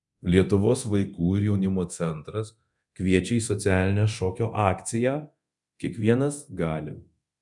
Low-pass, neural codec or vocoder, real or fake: 10.8 kHz; codec, 24 kHz, 0.9 kbps, DualCodec; fake